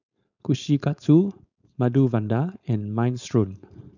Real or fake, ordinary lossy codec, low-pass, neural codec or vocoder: fake; none; 7.2 kHz; codec, 16 kHz, 4.8 kbps, FACodec